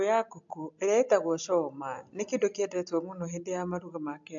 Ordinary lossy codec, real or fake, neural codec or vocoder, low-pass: AAC, 64 kbps; real; none; 7.2 kHz